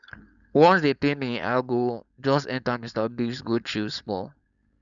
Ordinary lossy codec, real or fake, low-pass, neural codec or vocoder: none; fake; 7.2 kHz; codec, 16 kHz, 4.8 kbps, FACodec